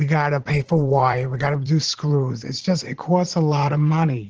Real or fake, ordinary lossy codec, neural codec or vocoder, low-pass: fake; Opus, 16 kbps; vocoder, 44.1 kHz, 80 mel bands, Vocos; 7.2 kHz